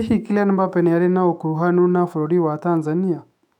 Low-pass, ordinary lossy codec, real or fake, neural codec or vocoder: 19.8 kHz; none; fake; autoencoder, 48 kHz, 128 numbers a frame, DAC-VAE, trained on Japanese speech